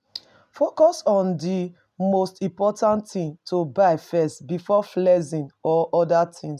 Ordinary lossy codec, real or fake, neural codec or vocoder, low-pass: none; real; none; 14.4 kHz